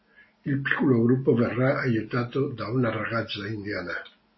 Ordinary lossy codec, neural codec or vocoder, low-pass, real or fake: MP3, 24 kbps; none; 7.2 kHz; real